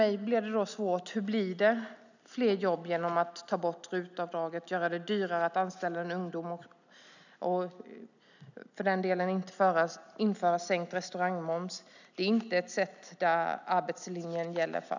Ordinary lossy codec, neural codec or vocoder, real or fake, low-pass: none; none; real; 7.2 kHz